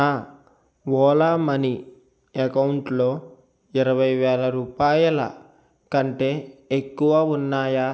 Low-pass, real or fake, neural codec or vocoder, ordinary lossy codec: none; real; none; none